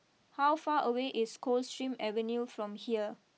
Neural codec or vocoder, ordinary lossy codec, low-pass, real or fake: none; none; none; real